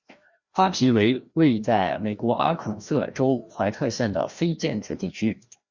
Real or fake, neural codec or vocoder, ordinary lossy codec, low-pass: fake; codec, 16 kHz, 1 kbps, FreqCodec, larger model; Opus, 64 kbps; 7.2 kHz